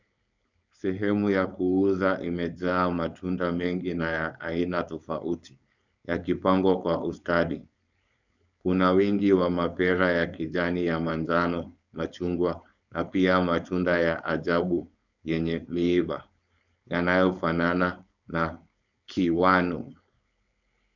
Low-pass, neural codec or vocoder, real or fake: 7.2 kHz; codec, 16 kHz, 4.8 kbps, FACodec; fake